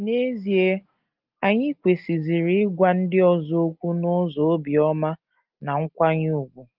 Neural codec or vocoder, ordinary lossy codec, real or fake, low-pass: none; Opus, 24 kbps; real; 5.4 kHz